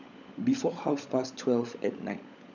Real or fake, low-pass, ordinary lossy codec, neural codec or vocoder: fake; 7.2 kHz; none; codec, 16 kHz, 16 kbps, FunCodec, trained on LibriTTS, 50 frames a second